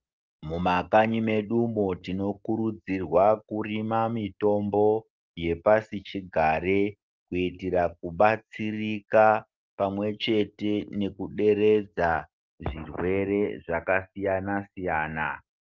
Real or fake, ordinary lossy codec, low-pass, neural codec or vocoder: real; Opus, 32 kbps; 7.2 kHz; none